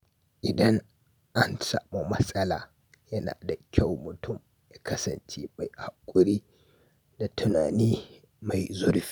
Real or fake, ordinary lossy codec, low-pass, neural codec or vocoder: real; none; none; none